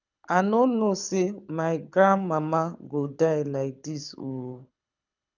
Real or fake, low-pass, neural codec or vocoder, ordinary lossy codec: fake; 7.2 kHz; codec, 24 kHz, 6 kbps, HILCodec; none